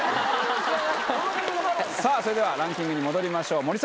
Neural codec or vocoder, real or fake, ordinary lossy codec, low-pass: none; real; none; none